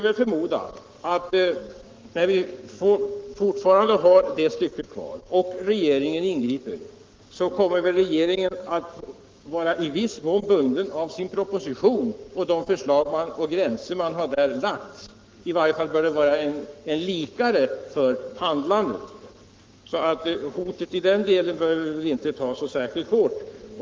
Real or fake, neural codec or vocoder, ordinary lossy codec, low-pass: fake; codec, 44.1 kHz, 7.8 kbps, Pupu-Codec; Opus, 32 kbps; 7.2 kHz